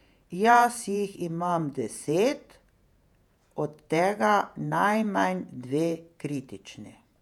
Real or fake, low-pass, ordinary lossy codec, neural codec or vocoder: fake; 19.8 kHz; none; vocoder, 48 kHz, 128 mel bands, Vocos